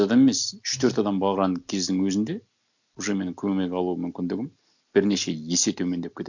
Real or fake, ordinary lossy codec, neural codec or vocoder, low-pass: real; none; none; 7.2 kHz